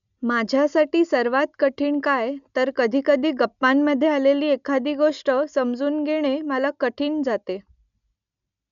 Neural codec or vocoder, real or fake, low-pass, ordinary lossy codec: none; real; 7.2 kHz; MP3, 96 kbps